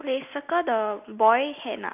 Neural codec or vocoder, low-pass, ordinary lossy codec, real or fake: none; 3.6 kHz; none; real